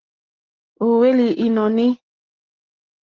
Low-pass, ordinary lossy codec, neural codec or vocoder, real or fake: 7.2 kHz; Opus, 16 kbps; none; real